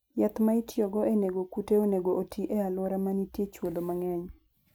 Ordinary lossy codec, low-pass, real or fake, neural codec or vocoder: none; none; real; none